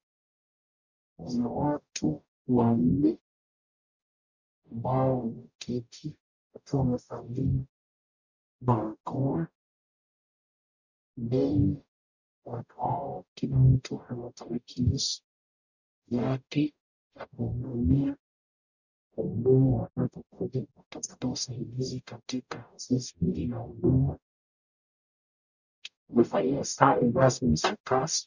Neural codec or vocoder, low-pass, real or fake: codec, 44.1 kHz, 0.9 kbps, DAC; 7.2 kHz; fake